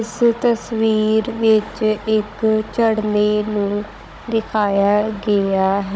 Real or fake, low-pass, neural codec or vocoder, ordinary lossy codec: fake; none; codec, 16 kHz, 16 kbps, FunCodec, trained on Chinese and English, 50 frames a second; none